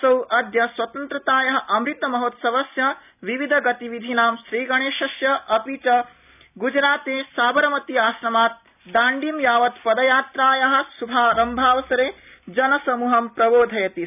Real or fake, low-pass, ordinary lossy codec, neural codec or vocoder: real; 3.6 kHz; none; none